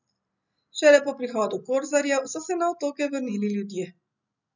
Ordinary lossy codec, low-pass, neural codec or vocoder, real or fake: none; 7.2 kHz; none; real